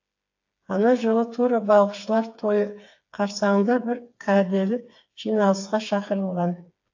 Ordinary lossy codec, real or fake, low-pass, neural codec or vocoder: AAC, 48 kbps; fake; 7.2 kHz; codec, 16 kHz, 4 kbps, FreqCodec, smaller model